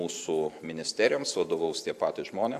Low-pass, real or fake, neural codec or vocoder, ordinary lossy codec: 14.4 kHz; fake; vocoder, 44.1 kHz, 128 mel bands every 512 samples, BigVGAN v2; AAC, 96 kbps